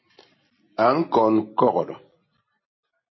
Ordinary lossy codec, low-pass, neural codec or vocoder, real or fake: MP3, 24 kbps; 7.2 kHz; none; real